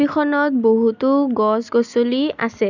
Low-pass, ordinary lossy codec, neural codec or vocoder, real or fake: 7.2 kHz; none; none; real